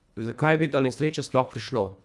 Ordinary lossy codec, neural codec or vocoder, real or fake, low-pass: none; codec, 24 kHz, 1.5 kbps, HILCodec; fake; none